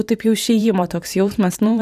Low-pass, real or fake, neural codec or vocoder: 14.4 kHz; fake; vocoder, 44.1 kHz, 128 mel bands every 512 samples, BigVGAN v2